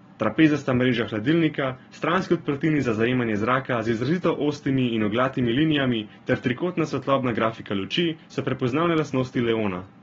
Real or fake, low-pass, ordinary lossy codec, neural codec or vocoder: real; 7.2 kHz; AAC, 24 kbps; none